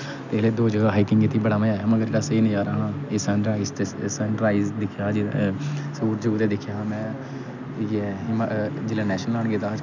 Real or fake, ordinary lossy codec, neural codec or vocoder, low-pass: real; none; none; 7.2 kHz